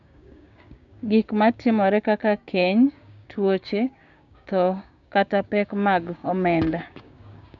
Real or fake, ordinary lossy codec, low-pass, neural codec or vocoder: fake; none; 7.2 kHz; codec, 16 kHz, 6 kbps, DAC